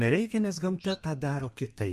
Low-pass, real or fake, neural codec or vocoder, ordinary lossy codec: 14.4 kHz; fake; codec, 32 kHz, 1.9 kbps, SNAC; MP3, 64 kbps